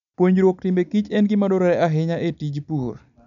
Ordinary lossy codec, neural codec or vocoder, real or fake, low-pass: none; none; real; 7.2 kHz